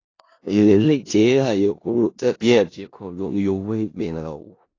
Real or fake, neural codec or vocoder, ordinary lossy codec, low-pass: fake; codec, 16 kHz in and 24 kHz out, 0.4 kbps, LongCat-Audio-Codec, four codebook decoder; AAC, 32 kbps; 7.2 kHz